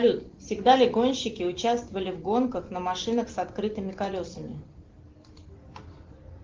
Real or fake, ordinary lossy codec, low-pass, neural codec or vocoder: real; Opus, 16 kbps; 7.2 kHz; none